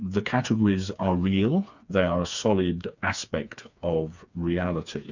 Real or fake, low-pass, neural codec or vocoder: fake; 7.2 kHz; codec, 16 kHz, 4 kbps, FreqCodec, smaller model